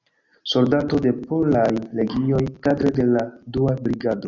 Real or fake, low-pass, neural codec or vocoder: real; 7.2 kHz; none